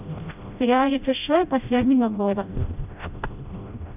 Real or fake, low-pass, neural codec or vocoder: fake; 3.6 kHz; codec, 16 kHz, 0.5 kbps, FreqCodec, smaller model